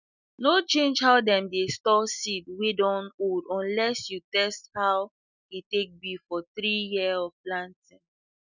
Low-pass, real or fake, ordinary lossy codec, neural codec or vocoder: 7.2 kHz; real; none; none